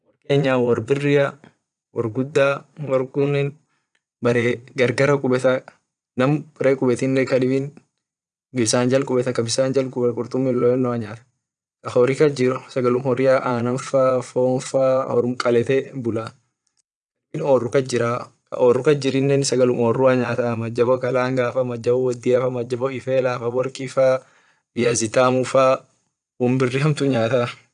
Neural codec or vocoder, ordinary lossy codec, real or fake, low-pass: vocoder, 22.05 kHz, 80 mel bands, Vocos; none; fake; 9.9 kHz